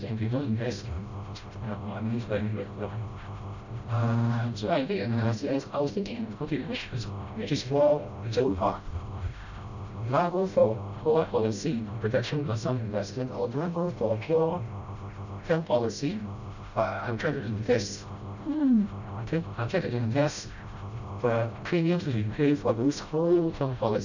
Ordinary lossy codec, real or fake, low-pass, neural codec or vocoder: none; fake; 7.2 kHz; codec, 16 kHz, 0.5 kbps, FreqCodec, smaller model